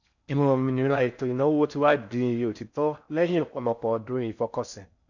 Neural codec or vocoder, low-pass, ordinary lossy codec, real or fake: codec, 16 kHz in and 24 kHz out, 0.6 kbps, FocalCodec, streaming, 4096 codes; 7.2 kHz; none; fake